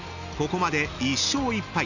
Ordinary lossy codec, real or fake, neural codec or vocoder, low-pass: none; real; none; 7.2 kHz